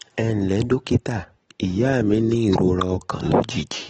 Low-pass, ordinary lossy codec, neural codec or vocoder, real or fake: 10.8 kHz; AAC, 24 kbps; none; real